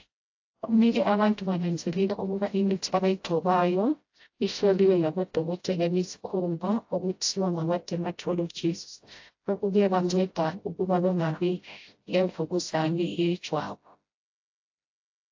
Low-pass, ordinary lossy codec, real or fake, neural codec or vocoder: 7.2 kHz; AAC, 48 kbps; fake; codec, 16 kHz, 0.5 kbps, FreqCodec, smaller model